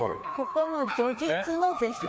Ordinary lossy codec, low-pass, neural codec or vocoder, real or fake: none; none; codec, 16 kHz, 2 kbps, FreqCodec, larger model; fake